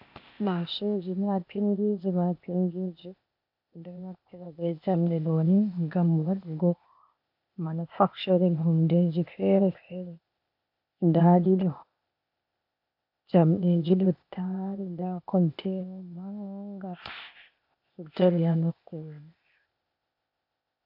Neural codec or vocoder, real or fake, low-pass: codec, 16 kHz, 0.8 kbps, ZipCodec; fake; 5.4 kHz